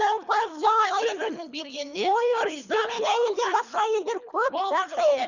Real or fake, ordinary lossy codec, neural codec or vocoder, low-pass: fake; none; codec, 24 kHz, 3 kbps, HILCodec; 7.2 kHz